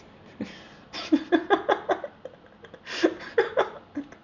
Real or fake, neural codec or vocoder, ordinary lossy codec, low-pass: real; none; none; 7.2 kHz